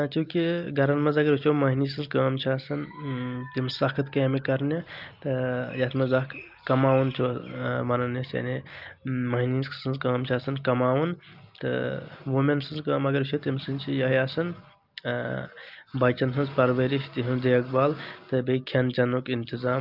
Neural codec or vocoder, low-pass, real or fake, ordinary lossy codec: none; 5.4 kHz; real; Opus, 24 kbps